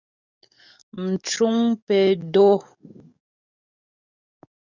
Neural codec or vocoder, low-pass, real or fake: codec, 44.1 kHz, 7.8 kbps, DAC; 7.2 kHz; fake